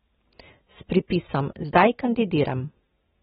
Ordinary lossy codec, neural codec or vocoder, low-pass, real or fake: AAC, 16 kbps; none; 19.8 kHz; real